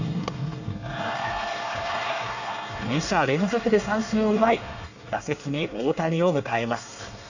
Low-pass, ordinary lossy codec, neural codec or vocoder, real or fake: 7.2 kHz; none; codec, 24 kHz, 1 kbps, SNAC; fake